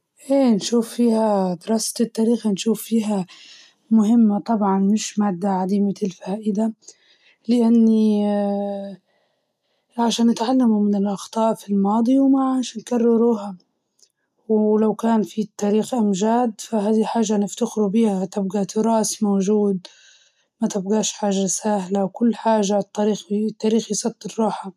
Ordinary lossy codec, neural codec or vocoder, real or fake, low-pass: none; none; real; 14.4 kHz